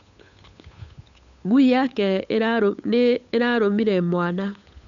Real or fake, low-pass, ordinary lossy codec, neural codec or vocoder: fake; 7.2 kHz; none; codec, 16 kHz, 8 kbps, FunCodec, trained on Chinese and English, 25 frames a second